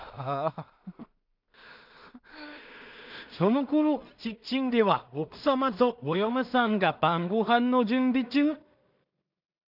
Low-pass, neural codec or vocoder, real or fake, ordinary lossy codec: 5.4 kHz; codec, 16 kHz in and 24 kHz out, 0.4 kbps, LongCat-Audio-Codec, two codebook decoder; fake; none